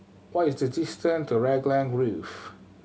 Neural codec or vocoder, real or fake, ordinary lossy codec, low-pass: none; real; none; none